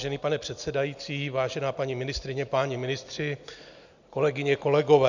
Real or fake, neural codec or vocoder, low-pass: real; none; 7.2 kHz